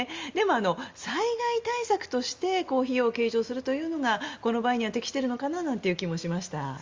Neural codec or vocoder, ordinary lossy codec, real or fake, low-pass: none; Opus, 32 kbps; real; 7.2 kHz